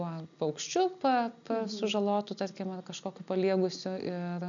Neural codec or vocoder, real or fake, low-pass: none; real; 7.2 kHz